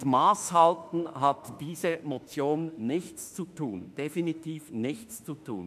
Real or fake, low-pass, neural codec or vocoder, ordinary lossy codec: fake; 14.4 kHz; autoencoder, 48 kHz, 32 numbers a frame, DAC-VAE, trained on Japanese speech; none